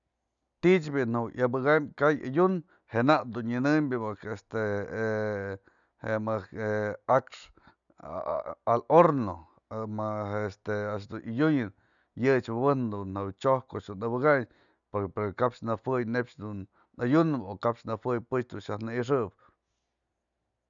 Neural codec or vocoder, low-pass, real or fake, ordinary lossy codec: none; 7.2 kHz; real; none